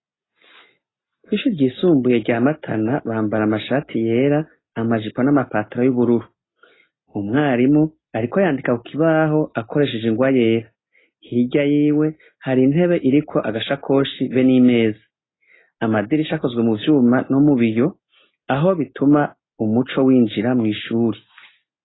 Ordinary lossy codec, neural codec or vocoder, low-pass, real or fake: AAC, 16 kbps; none; 7.2 kHz; real